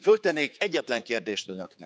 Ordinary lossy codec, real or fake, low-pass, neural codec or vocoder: none; fake; none; codec, 16 kHz, 4 kbps, X-Codec, HuBERT features, trained on general audio